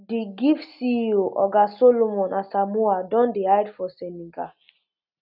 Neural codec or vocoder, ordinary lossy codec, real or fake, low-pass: none; none; real; 5.4 kHz